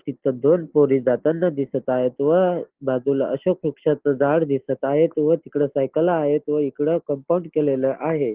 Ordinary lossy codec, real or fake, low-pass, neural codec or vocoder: Opus, 16 kbps; real; 3.6 kHz; none